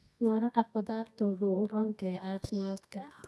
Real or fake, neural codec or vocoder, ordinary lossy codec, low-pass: fake; codec, 24 kHz, 0.9 kbps, WavTokenizer, medium music audio release; none; none